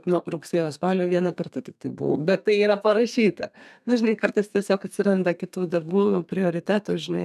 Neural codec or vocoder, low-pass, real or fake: codec, 32 kHz, 1.9 kbps, SNAC; 14.4 kHz; fake